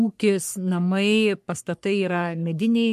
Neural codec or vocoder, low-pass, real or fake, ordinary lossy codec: codec, 44.1 kHz, 3.4 kbps, Pupu-Codec; 14.4 kHz; fake; MP3, 64 kbps